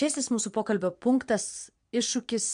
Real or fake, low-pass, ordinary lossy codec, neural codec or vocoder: fake; 9.9 kHz; MP3, 64 kbps; vocoder, 22.05 kHz, 80 mel bands, Vocos